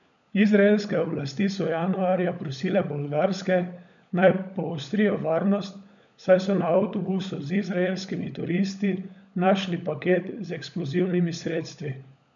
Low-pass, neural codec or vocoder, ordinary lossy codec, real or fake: 7.2 kHz; codec, 16 kHz, 16 kbps, FunCodec, trained on LibriTTS, 50 frames a second; none; fake